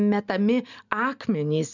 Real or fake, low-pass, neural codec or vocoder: real; 7.2 kHz; none